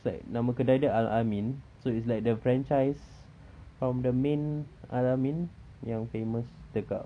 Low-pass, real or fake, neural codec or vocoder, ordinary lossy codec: 9.9 kHz; fake; vocoder, 44.1 kHz, 128 mel bands every 256 samples, BigVGAN v2; none